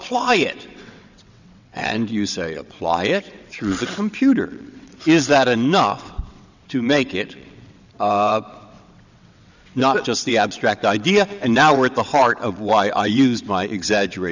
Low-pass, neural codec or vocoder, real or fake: 7.2 kHz; vocoder, 22.05 kHz, 80 mel bands, WaveNeXt; fake